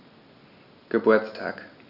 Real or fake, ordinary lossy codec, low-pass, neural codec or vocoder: real; none; 5.4 kHz; none